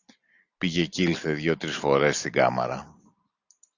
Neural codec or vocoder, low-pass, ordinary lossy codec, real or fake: none; 7.2 kHz; Opus, 64 kbps; real